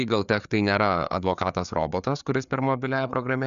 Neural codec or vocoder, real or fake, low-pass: codec, 16 kHz, 4 kbps, FreqCodec, larger model; fake; 7.2 kHz